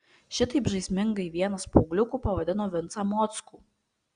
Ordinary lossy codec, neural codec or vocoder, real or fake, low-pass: Opus, 64 kbps; none; real; 9.9 kHz